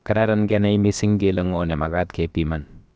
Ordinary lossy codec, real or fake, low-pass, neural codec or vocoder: none; fake; none; codec, 16 kHz, about 1 kbps, DyCAST, with the encoder's durations